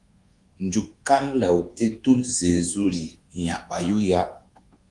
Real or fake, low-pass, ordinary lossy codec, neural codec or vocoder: fake; 10.8 kHz; Opus, 24 kbps; codec, 24 kHz, 1.2 kbps, DualCodec